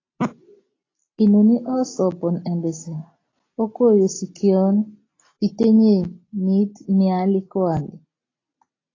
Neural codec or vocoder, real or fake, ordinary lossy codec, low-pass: none; real; AAC, 32 kbps; 7.2 kHz